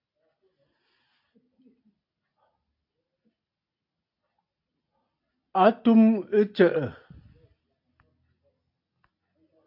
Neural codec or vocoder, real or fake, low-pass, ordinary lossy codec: none; real; 5.4 kHz; AAC, 48 kbps